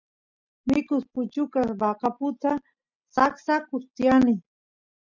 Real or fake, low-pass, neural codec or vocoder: real; 7.2 kHz; none